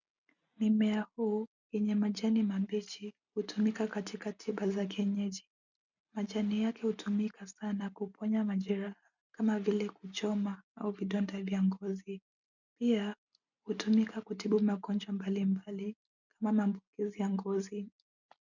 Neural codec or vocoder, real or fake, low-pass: none; real; 7.2 kHz